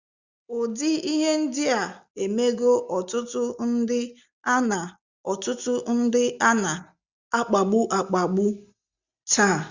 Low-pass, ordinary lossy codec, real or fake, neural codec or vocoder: 7.2 kHz; Opus, 64 kbps; real; none